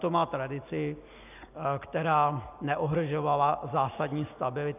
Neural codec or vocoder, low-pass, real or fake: none; 3.6 kHz; real